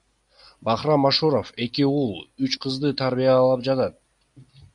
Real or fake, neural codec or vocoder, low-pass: real; none; 10.8 kHz